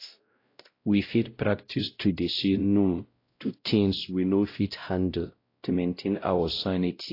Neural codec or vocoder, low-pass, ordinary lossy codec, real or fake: codec, 16 kHz, 0.5 kbps, X-Codec, WavLM features, trained on Multilingual LibriSpeech; 5.4 kHz; AAC, 32 kbps; fake